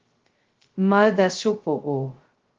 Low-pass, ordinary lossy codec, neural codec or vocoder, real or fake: 7.2 kHz; Opus, 16 kbps; codec, 16 kHz, 0.2 kbps, FocalCodec; fake